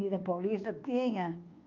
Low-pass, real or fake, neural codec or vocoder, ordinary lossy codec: 7.2 kHz; fake; codec, 24 kHz, 1.2 kbps, DualCodec; Opus, 24 kbps